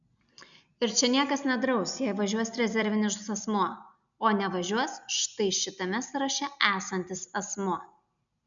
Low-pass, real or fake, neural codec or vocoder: 7.2 kHz; real; none